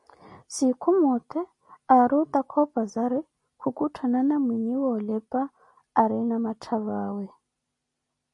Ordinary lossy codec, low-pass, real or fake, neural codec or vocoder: MP3, 48 kbps; 10.8 kHz; real; none